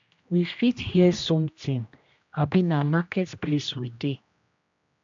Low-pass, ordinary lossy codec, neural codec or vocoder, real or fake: 7.2 kHz; AAC, 64 kbps; codec, 16 kHz, 1 kbps, X-Codec, HuBERT features, trained on general audio; fake